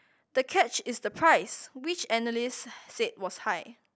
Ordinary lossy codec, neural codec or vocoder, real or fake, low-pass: none; none; real; none